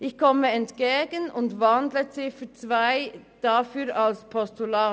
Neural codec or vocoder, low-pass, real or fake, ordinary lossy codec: none; none; real; none